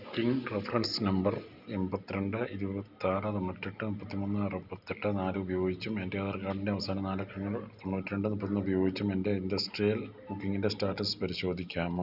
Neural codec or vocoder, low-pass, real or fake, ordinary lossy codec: none; 5.4 kHz; real; none